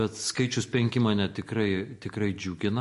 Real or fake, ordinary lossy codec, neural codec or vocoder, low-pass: real; MP3, 48 kbps; none; 14.4 kHz